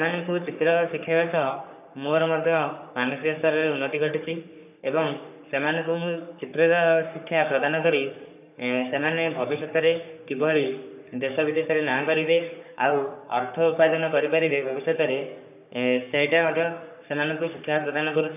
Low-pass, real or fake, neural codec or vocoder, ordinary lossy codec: 3.6 kHz; fake; codec, 44.1 kHz, 3.4 kbps, Pupu-Codec; none